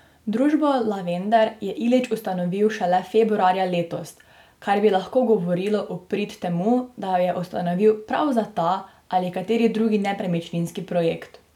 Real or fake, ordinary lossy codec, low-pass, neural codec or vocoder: real; none; 19.8 kHz; none